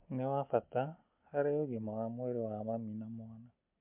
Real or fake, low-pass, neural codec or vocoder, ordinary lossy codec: real; 3.6 kHz; none; MP3, 32 kbps